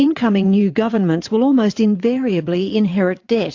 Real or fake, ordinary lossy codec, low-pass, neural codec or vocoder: fake; AAC, 48 kbps; 7.2 kHz; vocoder, 22.05 kHz, 80 mel bands, WaveNeXt